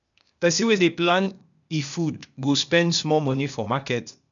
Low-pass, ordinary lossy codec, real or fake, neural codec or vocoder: 7.2 kHz; none; fake; codec, 16 kHz, 0.8 kbps, ZipCodec